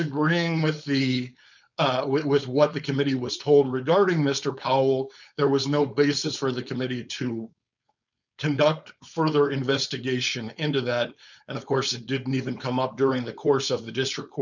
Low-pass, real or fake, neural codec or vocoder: 7.2 kHz; fake; codec, 16 kHz, 4.8 kbps, FACodec